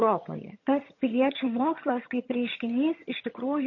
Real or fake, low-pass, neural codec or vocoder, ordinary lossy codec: fake; 7.2 kHz; vocoder, 22.05 kHz, 80 mel bands, HiFi-GAN; MP3, 32 kbps